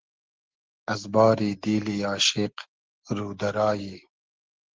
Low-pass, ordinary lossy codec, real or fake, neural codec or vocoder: 7.2 kHz; Opus, 16 kbps; real; none